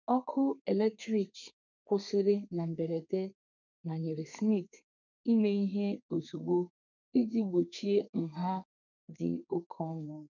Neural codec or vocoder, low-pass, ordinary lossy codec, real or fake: codec, 32 kHz, 1.9 kbps, SNAC; 7.2 kHz; none; fake